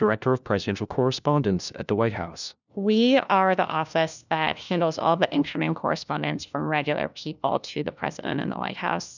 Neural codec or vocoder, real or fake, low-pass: codec, 16 kHz, 1 kbps, FunCodec, trained on LibriTTS, 50 frames a second; fake; 7.2 kHz